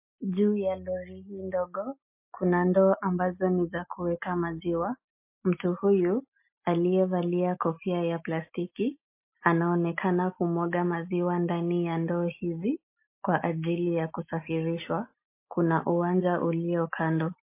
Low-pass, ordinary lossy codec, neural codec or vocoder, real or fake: 3.6 kHz; MP3, 24 kbps; none; real